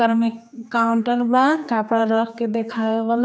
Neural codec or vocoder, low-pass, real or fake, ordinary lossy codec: codec, 16 kHz, 2 kbps, X-Codec, HuBERT features, trained on general audio; none; fake; none